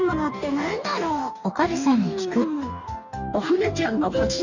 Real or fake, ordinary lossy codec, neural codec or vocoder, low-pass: fake; none; codec, 44.1 kHz, 2.6 kbps, DAC; 7.2 kHz